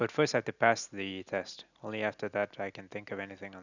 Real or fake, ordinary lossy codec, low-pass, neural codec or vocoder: real; none; 7.2 kHz; none